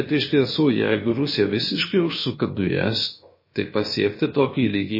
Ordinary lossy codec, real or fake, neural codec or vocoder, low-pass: MP3, 24 kbps; fake; codec, 16 kHz, about 1 kbps, DyCAST, with the encoder's durations; 5.4 kHz